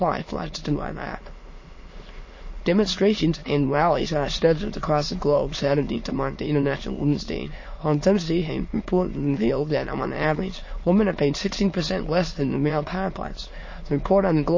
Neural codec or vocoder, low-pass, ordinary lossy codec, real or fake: autoencoder, 22.05 kHz, a latent of 192 numbers a frame, VITS, trained on many speakers; 7.2 kHz; MP3, 32 kbps; fake